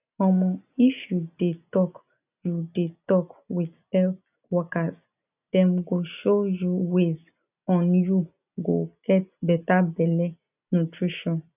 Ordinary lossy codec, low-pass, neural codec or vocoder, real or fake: none; 3.6 kHz; none; real